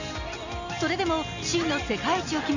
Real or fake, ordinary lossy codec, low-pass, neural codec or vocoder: real; none; 7.2 kHz; none